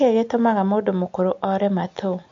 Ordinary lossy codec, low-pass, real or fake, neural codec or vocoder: MP3, 48 kbps; 7.2 kHz; real; none